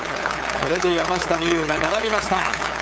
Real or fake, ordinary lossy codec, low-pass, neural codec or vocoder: fake; none; none; codec, 16 kHz, 8 kbps, FunCodec, trained on LibriTTS, 25 frames a second